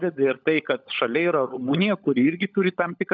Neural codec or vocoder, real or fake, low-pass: codec, 16 kHz, 16 kbps, FunCodec, trained on LibriTTS, 50 frames a second; fake; 7.2 kHz